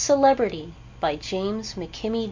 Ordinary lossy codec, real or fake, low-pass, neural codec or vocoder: MP3, 64 kbps; real; 7.2 kHz; none